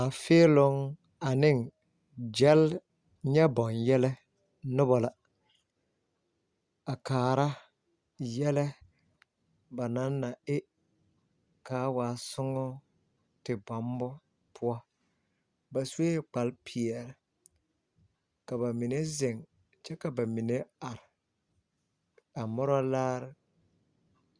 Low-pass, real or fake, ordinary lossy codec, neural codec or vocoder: 9.9 kHz; real; Opus, 64 kbps; none